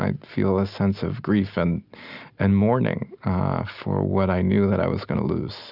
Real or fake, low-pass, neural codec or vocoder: real; 5.4 kHz; none